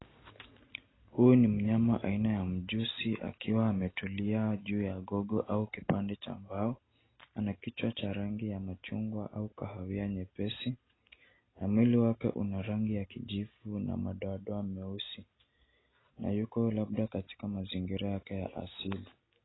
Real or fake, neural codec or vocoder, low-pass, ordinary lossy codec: real; none; 7.2 kHz; AAC, 16 kbps